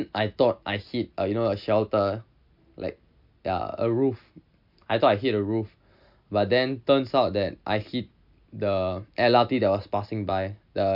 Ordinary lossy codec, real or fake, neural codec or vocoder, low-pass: MP3, 48 kbps; real; none; 5.4 kHz